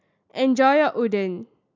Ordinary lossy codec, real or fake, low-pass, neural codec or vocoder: MP3, 64 kbps; real; 7.2 kHz; none